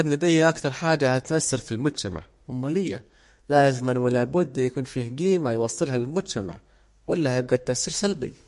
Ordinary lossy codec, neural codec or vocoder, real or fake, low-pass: MP3, 48 kbps; codec, 32 kHz, 1.9 kbps, SNAC; fake; 14.4 kHz